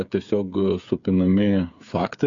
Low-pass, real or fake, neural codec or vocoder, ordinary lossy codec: 7.2 kHz; fake; codec, 16 kHz, 8 kbps, FreqCodec, smaller model; MP3, 64 kbps